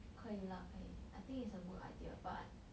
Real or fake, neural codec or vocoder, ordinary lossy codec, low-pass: real; none; none; none